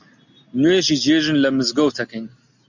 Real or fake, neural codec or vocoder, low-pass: real; none; 7.2 kHz